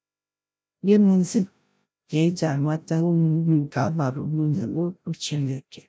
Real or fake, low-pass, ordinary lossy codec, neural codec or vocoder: fake; none; none; codec, 16 kHz, 0.5 kbps, FreqCodec, larger model